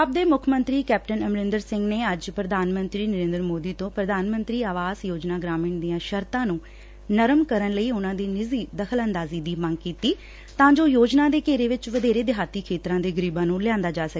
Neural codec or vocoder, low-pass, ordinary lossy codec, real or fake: none; none; none; real